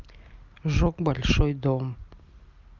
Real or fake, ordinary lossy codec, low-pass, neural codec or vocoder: real; Opus, 32 kbps; 7.2 kHz; none